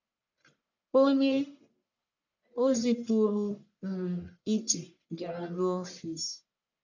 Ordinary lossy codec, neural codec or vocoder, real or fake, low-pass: none; codec, 44.1 kHz, 1.7 kbps, Pupu-Codec; fake; 7.2 kHz